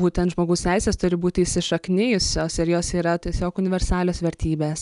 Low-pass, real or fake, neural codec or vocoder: 10.8 kHz; real; none